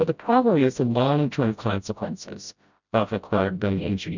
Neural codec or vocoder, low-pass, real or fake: codec, 16 kHz, 0.5 kbps, FreqCodec, smaller model; 7.2 kHz; fake